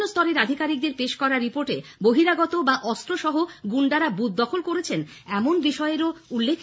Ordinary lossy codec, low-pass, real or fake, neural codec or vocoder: none; none; real; none